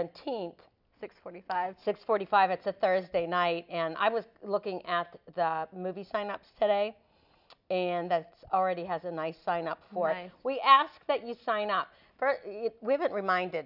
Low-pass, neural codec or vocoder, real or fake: 5.4 kHz; none; real